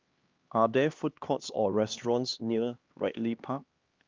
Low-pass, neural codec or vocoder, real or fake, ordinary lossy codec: 7.2 kHz; codec, 16 kHz, 2 kbps, X-Codec, HuBERT features, trained on LibriSpeech; fake; Opus, 24 kbps